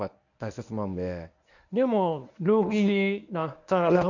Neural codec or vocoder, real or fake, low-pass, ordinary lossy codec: codec, 24 kHz, 0.9 kbps, WavTokenizer, medium speech release version 1; fake; 7.2 kHz; none